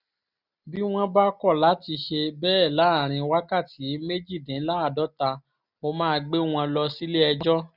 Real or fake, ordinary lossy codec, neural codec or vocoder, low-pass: real; none; none; 5.4 kHz